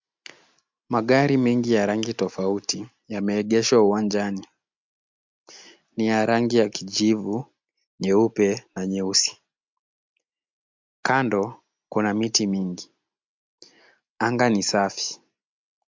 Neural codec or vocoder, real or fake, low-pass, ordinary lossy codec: none; real; 7.2 kHz; MP3, 64 kbps